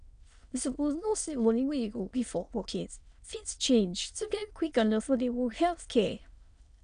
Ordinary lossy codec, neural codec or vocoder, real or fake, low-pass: none; autoencoder, 22.05 kHz, a latent of 192 numbers a frame, VITS, trained on many speakers; fake; 9.9 kHz